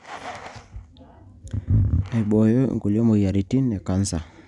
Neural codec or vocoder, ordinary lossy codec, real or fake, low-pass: none; none; real; 10.8 kHz